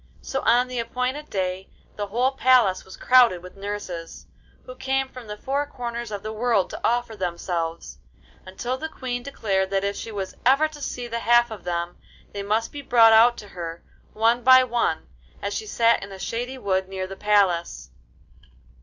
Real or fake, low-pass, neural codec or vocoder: real; 7.2 kHz; none